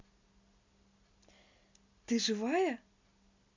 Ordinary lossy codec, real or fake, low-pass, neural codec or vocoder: MP3, 64 kbps; real; 7.2 kHz; none